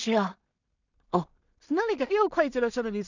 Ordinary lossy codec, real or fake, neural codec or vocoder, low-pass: none; fake; codec, 16 kHz in and 24 kHz out, 0.4 kbps, LongCat-Audio-Codec, two codebook decoder; 7.2 kHz